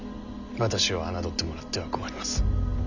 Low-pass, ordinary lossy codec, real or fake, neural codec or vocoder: 7.2 kHz; none; real; none